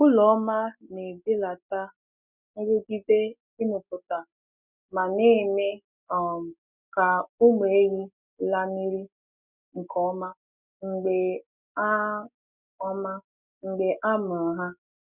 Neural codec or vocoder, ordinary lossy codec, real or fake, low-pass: none; none; real; 3.6 kHz